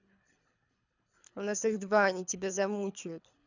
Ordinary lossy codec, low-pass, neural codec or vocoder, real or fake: none; 7.2 kHz; codec, 24 kHz, 3 kbps, HILCodec; fake